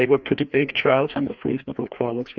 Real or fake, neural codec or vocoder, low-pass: fake; codec, 16 kHz, 2 kbps, FreqCodec, larger model; 7.2 kHz